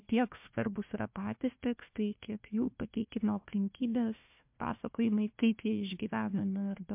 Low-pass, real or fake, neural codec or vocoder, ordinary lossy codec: 3.6 kHz; fake; codec, 16 kHz, 1 kbps, FunCodec, trained on Chinese and English, 50 frames a second; MP3, 32 kbps